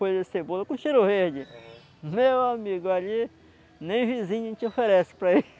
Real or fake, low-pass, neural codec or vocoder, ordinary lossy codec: real; none; none; none